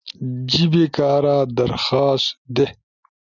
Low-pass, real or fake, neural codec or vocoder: 7.2 kHz; real; none